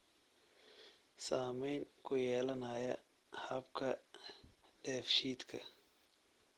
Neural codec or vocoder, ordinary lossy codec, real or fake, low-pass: none; Opus, 16 kbps; real; 19.8 kHz